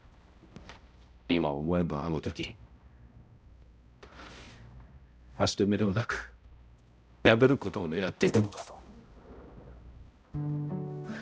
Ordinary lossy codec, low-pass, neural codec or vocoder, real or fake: none; none; codec, 16 kHz, 0.5 kbps, X-Codec, HuBERT features, trained on balanced general audio; fake